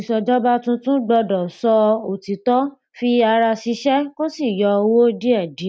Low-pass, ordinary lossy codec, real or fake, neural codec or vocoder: none; none; real; none